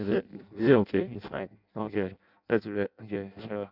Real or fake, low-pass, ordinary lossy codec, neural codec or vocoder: fake; 5.4 kHz; none; codec, 16 kHz in and 24 kHz out, 0.6 kbps, FireRedTTS-2 codec